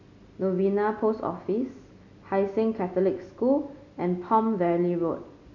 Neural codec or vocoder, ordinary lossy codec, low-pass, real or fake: none; none; 7.2 kHz; real